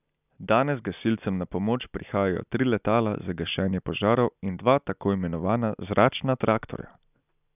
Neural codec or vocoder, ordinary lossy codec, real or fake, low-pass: none; none; real; 3.6 kHz